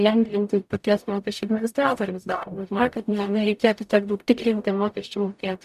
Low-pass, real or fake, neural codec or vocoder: 14.4 kHz; fake; codec, 44.1 kHz, 0.9 kbps, DAC